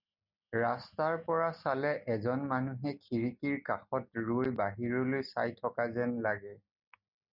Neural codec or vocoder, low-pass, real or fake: none; 5.4 kHz; real